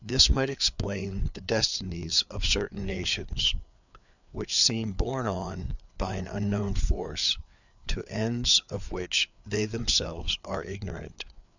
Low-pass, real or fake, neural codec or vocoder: 7.2 kHz; fake; codec, 16 kHz, 4 kbps, FreqCodec, larger model